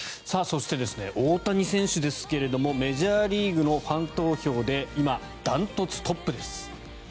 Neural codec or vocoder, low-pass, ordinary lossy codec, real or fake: none; none; none; real